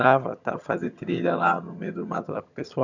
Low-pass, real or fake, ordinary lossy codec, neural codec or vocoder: 7.2 kHz; fake; none; vocoder, 22.05 kHz, 80 mel bands, HiFi-GAN